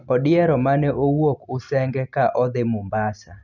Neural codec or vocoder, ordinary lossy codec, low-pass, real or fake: none; none; 7.2 kHz; real